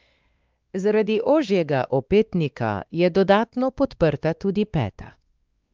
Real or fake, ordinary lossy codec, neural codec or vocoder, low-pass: fake; Opus, 24 kbps; codec, 16 kHz, 2 kbps, X-Codec, WavLM features, trained on Multilingual LibriSpeech; 7.2 kHz